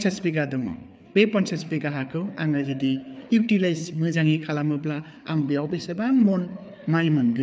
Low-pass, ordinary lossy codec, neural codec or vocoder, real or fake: none; none; codec, 16 kHz, 4 kbps, FreqCodec, larger model; fake